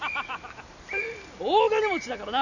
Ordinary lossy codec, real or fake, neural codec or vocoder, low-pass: none; real; none; 7.2 kHz